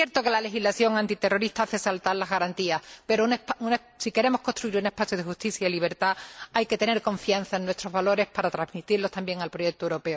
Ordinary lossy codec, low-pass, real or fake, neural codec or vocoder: none; none; real; none